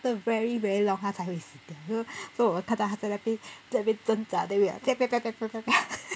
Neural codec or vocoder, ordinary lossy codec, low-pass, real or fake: none; none; none; real